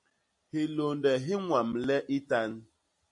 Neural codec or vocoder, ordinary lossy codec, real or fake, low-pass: none; MP3, 48 kbps; real; 10.8 kHz